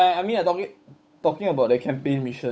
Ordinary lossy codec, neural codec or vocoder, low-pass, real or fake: none; codec, 16 kHz, 8 kbps, FunCodec, trained on Chinese and English, 25 frames a second; none; fake